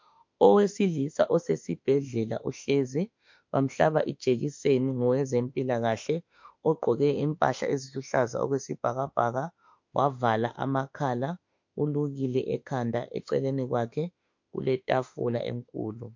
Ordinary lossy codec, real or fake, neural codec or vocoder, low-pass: MP3, 48 kbps; fake; autoencoder, 48 kHz, 32 numbers a frame, DAC-VAE, trained on Japanese speech; 7.2 kHz